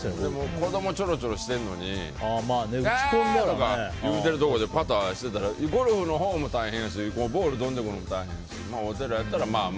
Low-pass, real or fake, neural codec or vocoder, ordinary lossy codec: none; real; none; none